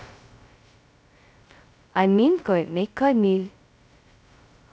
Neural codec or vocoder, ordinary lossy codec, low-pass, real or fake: codec, 16 kHz, 0.2 kbps, FocalCodec; none; none; fake